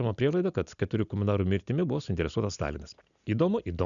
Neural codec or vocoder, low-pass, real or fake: none; 7.2 kHz; real